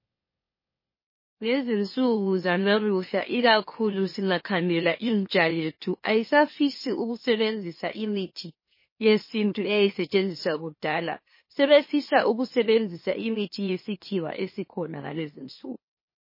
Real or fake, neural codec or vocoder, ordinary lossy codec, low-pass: fake; autoencoder, 44.1 kHz, a latent of 192 numbers a frame, MeloTTS; MP3, 24 kbps; 5.4 kHz